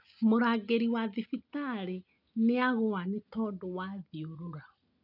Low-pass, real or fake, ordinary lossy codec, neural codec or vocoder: 5.4 kHz; real; none; none